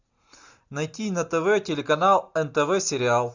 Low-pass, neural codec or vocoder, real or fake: 7.2 kHz; none; real